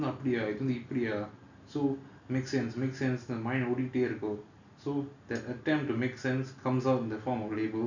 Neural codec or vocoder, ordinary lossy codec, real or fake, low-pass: none; none; real; 7.2 kHz